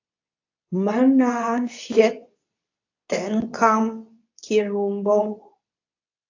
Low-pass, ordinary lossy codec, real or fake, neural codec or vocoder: 7.2 kHz; AAC, 48 kbps; fake; codec, 24 kHz, 0.9 kbps, WavTokenizer, medium speech release version 2